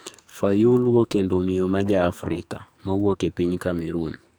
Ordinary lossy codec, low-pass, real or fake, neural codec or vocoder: none; none; fake; codec, 44.1 kHz, 2.6 kbps, SNAC